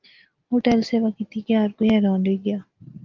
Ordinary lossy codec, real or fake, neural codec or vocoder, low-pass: Opus, 32 kbps; real; none; 7.2 kHz